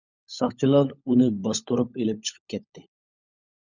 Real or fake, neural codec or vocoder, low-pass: fake; codec, 16 kHz, 16 kbps, FunCodec, trained on LibriTTS, 50 frames a second; 7.2 kHz